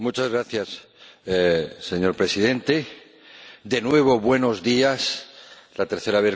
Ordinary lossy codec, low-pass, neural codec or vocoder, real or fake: none; none; none; real